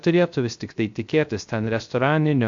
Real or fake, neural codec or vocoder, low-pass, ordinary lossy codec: fake; codec, 16 kHz, 0.3 kbps, FocalCodec; 7.2 kHz; AAC, 64 kbps